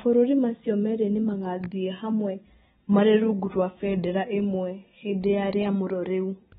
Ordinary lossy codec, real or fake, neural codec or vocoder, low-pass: AAC, 16 kbps; real; none; 7.2 kHz